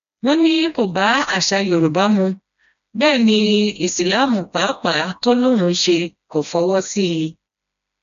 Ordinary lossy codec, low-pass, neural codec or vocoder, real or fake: none; 7.2 kHz; codec, 16 kHz, 1 kbps, FreqCodec, smaller model; fake